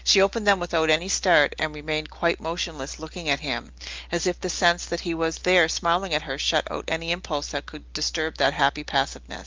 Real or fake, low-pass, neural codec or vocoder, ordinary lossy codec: real; 7.2 kHz; none; Opus, 32 kbps